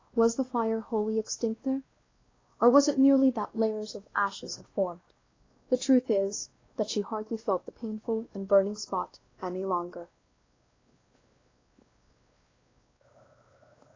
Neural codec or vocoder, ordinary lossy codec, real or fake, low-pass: codec, 16 kHz, 1 kbps, X-Codec, WavLM features, trained on Multilingual LibriSpeech; AAC, 32 kbps; fake; 7.2 kHz